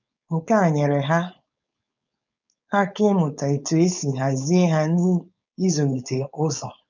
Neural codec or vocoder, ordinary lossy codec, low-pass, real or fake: codec, 16 kHz, 4.8 kbps, FACodec; none; 7.2 kHz; fake